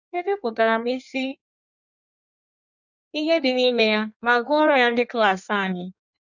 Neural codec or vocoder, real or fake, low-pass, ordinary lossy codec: codec, 16 kHz in and 24 kHz out, 1.1 kbps, FireRedTTS-2 codec; fake; 7.2 kHz; none